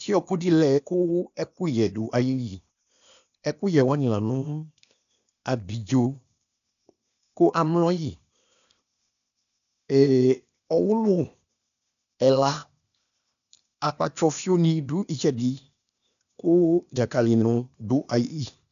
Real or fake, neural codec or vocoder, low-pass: fake; codec, 16 kHz, 0.8 kbps, ZipCodec; 7.2 kHz